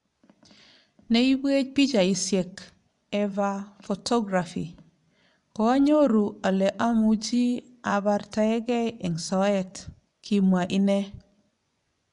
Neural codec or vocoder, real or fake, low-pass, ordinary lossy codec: vocoder, 24 kHz, 100 mel bands, Vocos; fake; 10.8 kHz; none